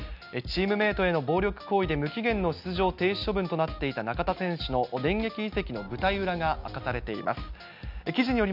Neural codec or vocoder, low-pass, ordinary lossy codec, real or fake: none; 5.4 kHz; none; real